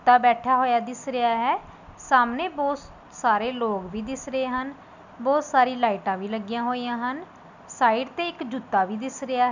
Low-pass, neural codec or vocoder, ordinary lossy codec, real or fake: 7.2 kHz; none; none; real